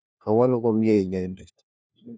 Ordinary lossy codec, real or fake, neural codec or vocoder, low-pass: none; fake; codec, 16 kHz, 1 kbps, FunCodec, trained on LibriTTS, 50 frames a second; none